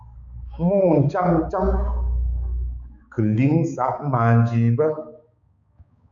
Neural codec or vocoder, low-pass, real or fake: codec, 16 kHz, 4 kbps, X-Codec, HuBERT features, trained on balanced general audio; 7.2 kHz; fake